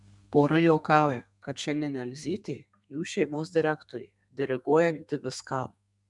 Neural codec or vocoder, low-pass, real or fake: codec, 32 kHz, 1.9 kbps, SNAC; 10.8 kHz; fake